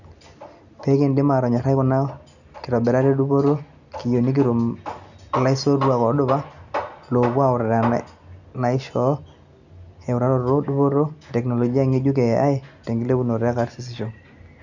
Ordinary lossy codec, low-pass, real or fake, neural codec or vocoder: none; 7.2 kHz; real; none